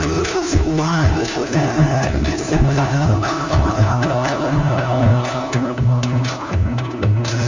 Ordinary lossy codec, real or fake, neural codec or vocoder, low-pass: Opus, 64 kbps; fake; codec, 16 kHz, 1 kbps, FunCodec, trained on LibriTTS, 50 frames a second; 7.2 kHz